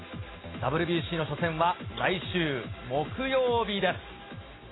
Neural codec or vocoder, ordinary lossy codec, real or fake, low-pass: none; AAC, 16 kbps; real; 7.2 kHz